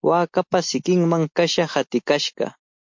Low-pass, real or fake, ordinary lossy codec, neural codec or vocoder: 7.2 kHz; real; MP3, 48 kbps; none